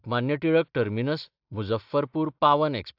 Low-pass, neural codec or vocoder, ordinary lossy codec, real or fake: 5.4 kHz; none; AAC, 48 kbps; real